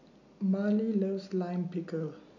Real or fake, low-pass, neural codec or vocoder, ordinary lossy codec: real; 7.2 kHz; none; none